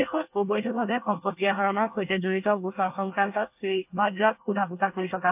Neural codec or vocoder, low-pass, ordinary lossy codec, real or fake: codec, 24 kHz, 1 kbps, SNAC; 3.6 kHz; AAC, 32 kbps; fake